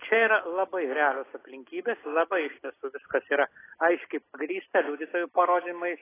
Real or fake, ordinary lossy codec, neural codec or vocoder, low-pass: real; AAC, 16 kbps; none; 3.6 kHz